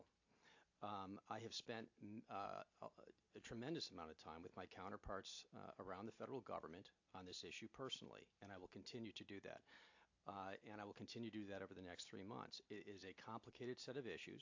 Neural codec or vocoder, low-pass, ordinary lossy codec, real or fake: none; 7.2 kHz; AAC, 48 kbps; real